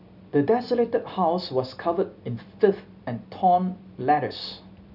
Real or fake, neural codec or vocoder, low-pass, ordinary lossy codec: real; none; 5.4 kHz; none